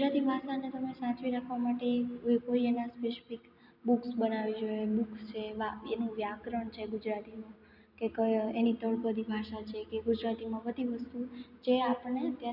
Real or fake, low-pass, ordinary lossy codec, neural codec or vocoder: real; 5.4 kHz; none; none